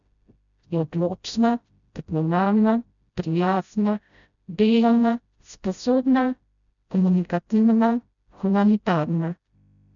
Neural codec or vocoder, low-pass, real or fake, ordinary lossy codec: codec, 16 kHz, 0.5 kbps, FreqCodec, smaller model; 7.2 kHz; fake; none